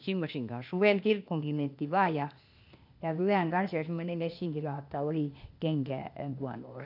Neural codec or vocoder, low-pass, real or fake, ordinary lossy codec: codec, 16 kHz, 0.8 kbps, ZipCodec; 5.4 kHz; fake; none